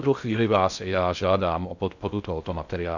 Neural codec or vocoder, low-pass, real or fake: codec, 16 kHz in and 24 kHz out, 0.6 kbps, FocalCodec, streaming, 2048 codes; 7.2 kHz; fake